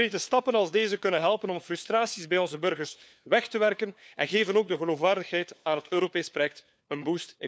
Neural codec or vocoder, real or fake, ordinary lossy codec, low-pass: codec, 16 kHz, 4 kbps, FunCodec, trained on LibriTTS, 50 frames a second; fake; none; none